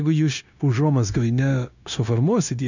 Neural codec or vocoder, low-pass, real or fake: codec, 16 kHz, 0.9 kbps, LongCat-Audio-Codec; 7.2 kHz; fake